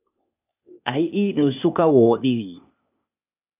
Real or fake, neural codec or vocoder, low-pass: fake; codec, 16 kHz, 0.8 kbps, ZipCodec; 3.6 kHz